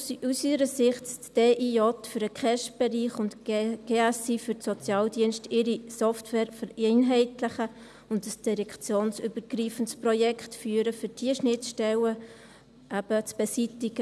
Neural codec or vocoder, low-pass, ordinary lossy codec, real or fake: none; none; none; real